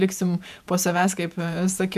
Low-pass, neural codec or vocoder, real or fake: 14.4 kHz; vocoder, 48 kHz, 128 mel bands, Vocos; fake